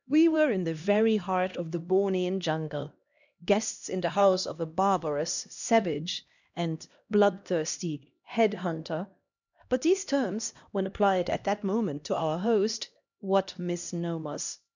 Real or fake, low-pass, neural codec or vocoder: fake; 7.2 kHz; codec, 16 kHz, 1 kbps, X-Codec, HuBERT features, trained on LibriSpeech